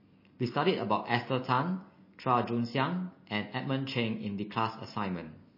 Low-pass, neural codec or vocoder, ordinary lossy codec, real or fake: 5.4 kHz; none; MP3, 24 kbps; real